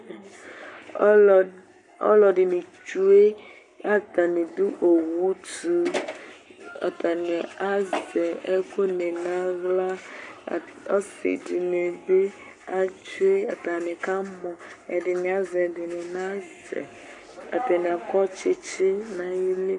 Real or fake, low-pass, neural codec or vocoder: fake; 10.8 kHz; codec, 44.1 kHz, 7.8 kbps, Pupu-Codec